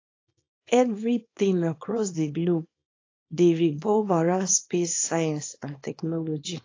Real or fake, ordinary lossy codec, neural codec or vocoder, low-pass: fake; AAC, 32 kbps; codec, 24 kHz, 0.9 kbps, WavTokenizer, small release; 7.2 kHz